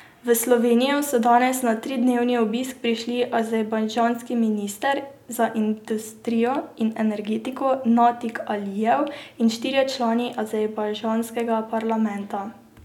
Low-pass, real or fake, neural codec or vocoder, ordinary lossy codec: 19.8 kHz; real; none; none